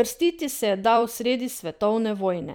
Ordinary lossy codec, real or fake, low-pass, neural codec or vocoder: none; fake; none; vocoder, 44.1 kHz, 128 mel bands every 256 samples, BigVGAN v2